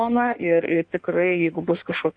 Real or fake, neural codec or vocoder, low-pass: fake; codec, 16 kHz in and 24 kHz out, 1.1 kbps, FireRedTTS-2 codec; 9.9 kHz